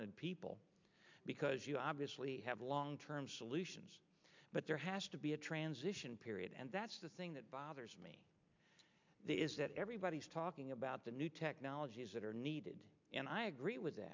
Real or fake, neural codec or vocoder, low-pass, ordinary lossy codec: real; none; 7.2 kHz; MP3, 64 kbps